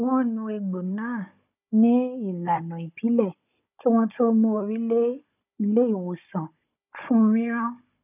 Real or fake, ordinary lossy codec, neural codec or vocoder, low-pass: fake; none; codec, 16 kHz, 16 kbps, FunCodec, trained on Chinese and English, 50 frames a second; 3.6 kHz